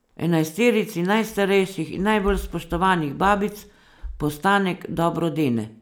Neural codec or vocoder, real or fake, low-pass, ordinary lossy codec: none; real; none; none